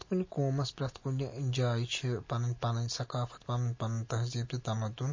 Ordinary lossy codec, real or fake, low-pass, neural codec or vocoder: MP3, 32 kbps; real; 7.2 kHz; none